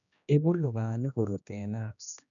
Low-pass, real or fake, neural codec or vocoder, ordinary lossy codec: 7.2 kHz; fake; codec, 16 kHz, 1 kbps, X-Codec, HuBERT features, trained on general audio; none